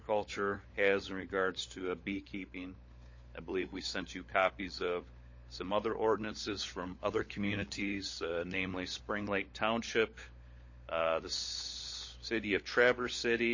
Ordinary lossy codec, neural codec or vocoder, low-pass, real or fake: MP3, 32 kbps; codec, 16 kHz, 16 kbps, FunCodec, trained on LibriTTS, 50 frames a second; 7.2 kHz; fake